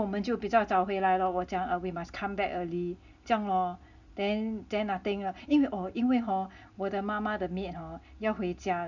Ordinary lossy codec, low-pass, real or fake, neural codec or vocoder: none; 7.2 kHz; real; none